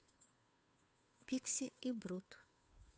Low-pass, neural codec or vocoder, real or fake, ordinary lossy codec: none; codec, 16 kHz, 2 kbps, FunCodec, trained on Chinese and English, 25 frames a second; fake; none